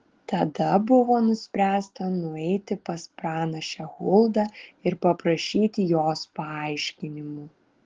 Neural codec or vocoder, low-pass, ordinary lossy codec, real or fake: none; 7.2 kHz; Opus, 16 kbps; real